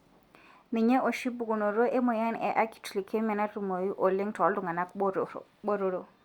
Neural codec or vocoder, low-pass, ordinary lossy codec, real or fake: none; 19.8 kHz; Opus, 64 kbps; real